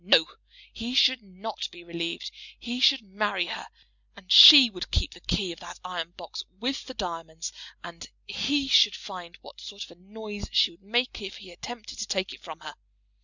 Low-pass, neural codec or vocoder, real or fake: 7.2 kHz; none; real